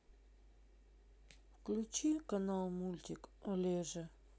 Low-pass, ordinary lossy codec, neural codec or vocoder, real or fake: none; none; none; real